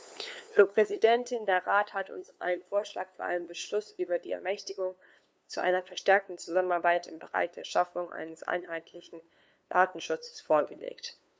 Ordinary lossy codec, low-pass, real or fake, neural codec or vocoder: none; none; fake; codec, 16 kHz, 2 kbps, FunCodec, trained on LibriTTS, 25 frames a second